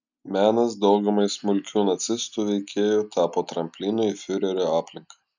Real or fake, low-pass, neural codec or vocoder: real; 7.2 kHz; none